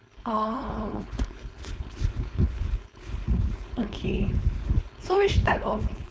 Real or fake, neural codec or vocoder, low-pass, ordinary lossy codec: fake; codec, 16 kHz, 4.8 kbps, FACodec; none; none